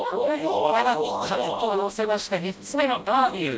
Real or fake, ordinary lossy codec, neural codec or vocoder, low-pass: fake; none; codec, 16 kHz, 0.5 kbps, FreqCodec, smaller model; none